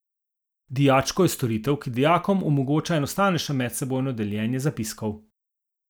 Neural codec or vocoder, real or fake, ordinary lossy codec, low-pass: none; real; none; none